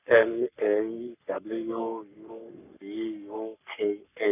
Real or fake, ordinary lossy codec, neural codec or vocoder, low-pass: fake; none; codec, 44.1 kHz, 3.4 kbps, Pupu-Codec; 3.6 kHz